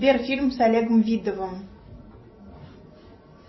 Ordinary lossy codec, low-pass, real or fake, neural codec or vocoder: MP3, 24 kbps; 7.2 kHz; real; none